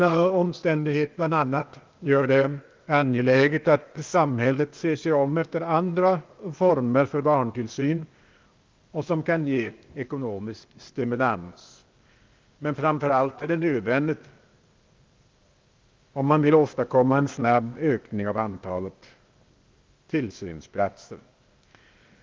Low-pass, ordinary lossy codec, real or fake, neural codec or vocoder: 7.2 kHz; Opus, 32 kbps; fake; codec, 16 kHz, 0.8 kbps, ZipCodec